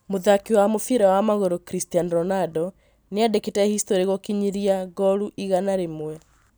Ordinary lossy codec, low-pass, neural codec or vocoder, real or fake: none; none; none; real